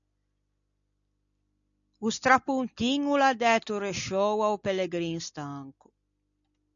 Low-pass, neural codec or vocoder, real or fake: 7.2 kHz; none; real